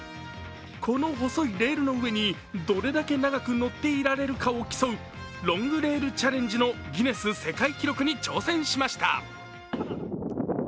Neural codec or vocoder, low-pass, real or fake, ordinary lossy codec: none; none; real; none